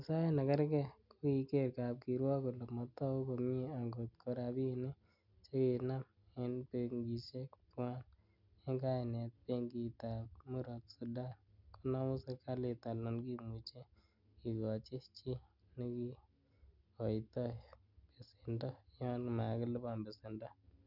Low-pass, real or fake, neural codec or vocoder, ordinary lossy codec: 5.4 kHz; real; none; none